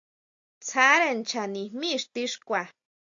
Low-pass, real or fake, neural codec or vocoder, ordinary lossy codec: 7.2 kHz; real; none; AAC, 64 kbps